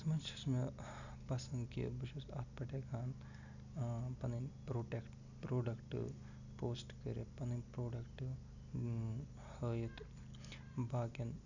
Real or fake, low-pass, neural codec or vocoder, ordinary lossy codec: real; 7.2 kHz; none; none